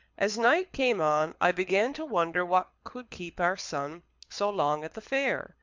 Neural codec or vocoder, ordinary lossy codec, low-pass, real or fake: codec, 24 kHz, 6 kbps, HILCodec; MP3, 64 kbps; 7.2 kHz; fake